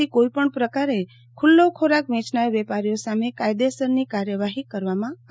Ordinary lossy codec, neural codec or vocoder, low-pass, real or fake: none; none; none; real